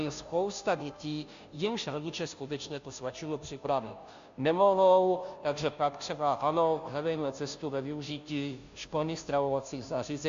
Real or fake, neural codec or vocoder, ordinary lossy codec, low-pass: fake; codec, 16 kHz, 0.5 kbps, FunCodec, trained on Chinese and English, 25 frames a second; AAC, 64 kbps; 7.2 kHz